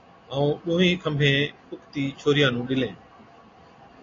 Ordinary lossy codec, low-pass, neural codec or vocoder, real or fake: AAC, 32 kbps; 7.2 kHz; none; real